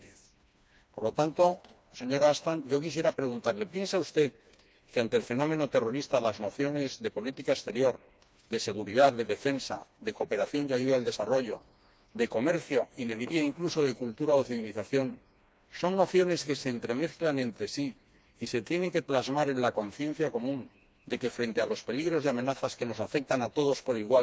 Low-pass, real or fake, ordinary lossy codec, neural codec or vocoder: none; fake; none; codec, 16 kHz, 2 kbps, FreqCodec, smaller model